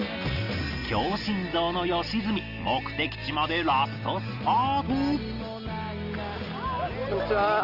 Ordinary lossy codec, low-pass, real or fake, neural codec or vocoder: Opus, 24 kbps; 5.4 kHz; real; none